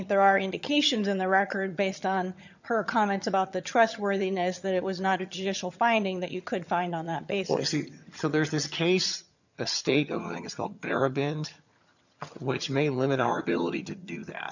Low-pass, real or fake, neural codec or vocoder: 7.2 kHz; fake; vocoder, 22.05 kHz, 80 mel bands, HiFi-GAN